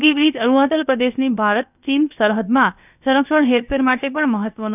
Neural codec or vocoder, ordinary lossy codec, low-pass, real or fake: codec, 16 kHz, about 1 kbps, DyCAST, with the encoder's durations; none; 3.6 kHz; fake